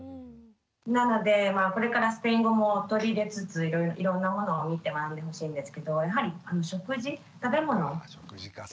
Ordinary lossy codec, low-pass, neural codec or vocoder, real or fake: none; none; none; real